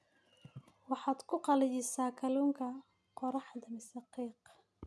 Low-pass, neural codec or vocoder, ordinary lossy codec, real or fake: none; none; none; real